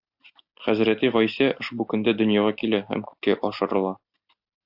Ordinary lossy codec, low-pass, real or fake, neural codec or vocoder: AAC, 48 kbps; 5.4 kHz; real; none